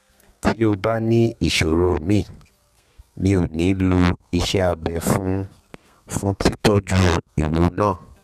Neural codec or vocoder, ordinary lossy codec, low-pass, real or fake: codec, 32 kHz, 1.9 kbps, SNAC; none; 14.4 kHz; fake